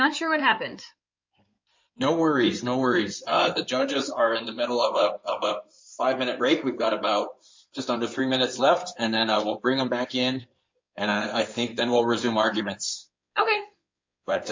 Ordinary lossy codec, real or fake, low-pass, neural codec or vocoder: MP3, 64 kbps; fake; 7.2 kHz; codec, 16 kHz in and 24 kHz out, 2.2 kbps, FireRedTTS-2 codec